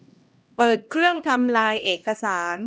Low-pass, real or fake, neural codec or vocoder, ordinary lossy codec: none; fake; codec, 16 kHz, 1 kbps, X-Codec, HuBERT features, trained on LibriSpeech; none